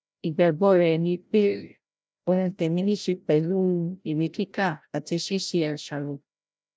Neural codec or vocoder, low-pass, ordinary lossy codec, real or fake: codec, 16 kHz, 0.5 kbps, FreqCodec, larger model; none; none; fake